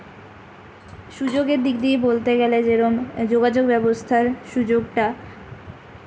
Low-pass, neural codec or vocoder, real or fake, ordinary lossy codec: none; none; real; none